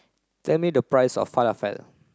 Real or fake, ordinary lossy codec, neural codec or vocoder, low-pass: real; none; none; none